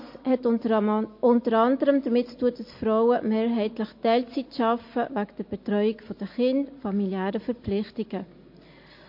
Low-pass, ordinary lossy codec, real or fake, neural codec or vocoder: 5.4 kHz; MP3, 32 kbps; real; none